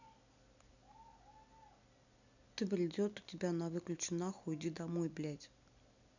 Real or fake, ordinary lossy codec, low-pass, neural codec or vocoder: real; none; 7.2 kHz; none